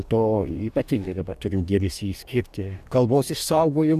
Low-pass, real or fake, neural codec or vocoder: 14.4 kHz; fake; codec, 44.1 kHz, 2.6 kbps, DAC